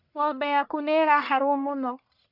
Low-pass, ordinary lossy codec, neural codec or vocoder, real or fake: 5.4 kHz; none; codec, 44.1 kHz, 1.7 kbps, Pupu-Codec; fake